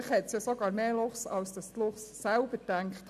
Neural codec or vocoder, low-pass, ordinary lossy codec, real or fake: none; 14.4 kHz; none; real